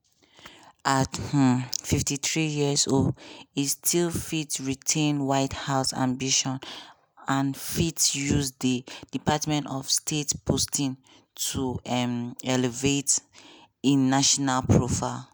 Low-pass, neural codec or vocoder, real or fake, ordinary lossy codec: none; none; real; none